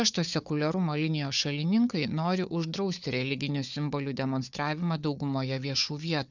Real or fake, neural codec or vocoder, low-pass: fake; codec, 16 kHz, 4 kbps, FunCodec, trained on Chinese and English, 50 frames a second; 7.2 kHz